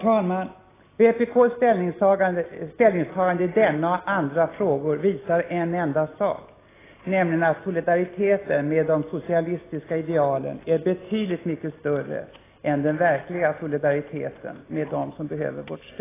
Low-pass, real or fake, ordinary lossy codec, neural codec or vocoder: 3.6 kHz; real; AAC, 16 kbps; none